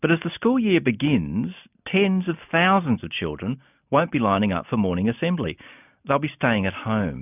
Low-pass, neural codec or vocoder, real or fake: 3.6 kHz; none; real